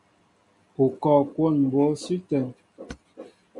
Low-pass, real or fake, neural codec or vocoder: 10.8 kHz; real; none